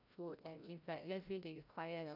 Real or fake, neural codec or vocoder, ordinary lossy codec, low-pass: fake; codec, 16 kHz, 0.5 kbps, FreqCodec, larger model; none; 5.4 kHz